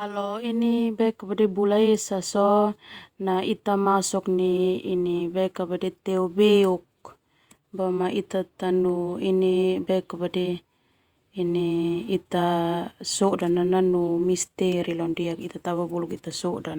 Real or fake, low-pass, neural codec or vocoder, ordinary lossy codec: fake; 19.8 kHz; vocoder, 48 kHz, 128 mel bands, Vocos; Opus, 64 kbps